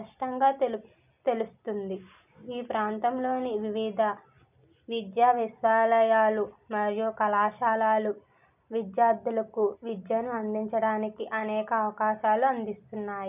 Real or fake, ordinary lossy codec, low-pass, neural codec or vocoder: real; none; 3.6 kHz; none